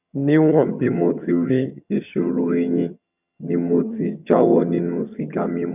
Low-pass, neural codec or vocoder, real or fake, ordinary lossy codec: 3.6 kHz; vocoder, 22.05 kHz, 80 mel bands, HiFi-GAN; fake; none